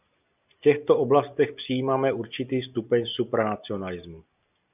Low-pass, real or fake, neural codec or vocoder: 3.6 kHz; real; none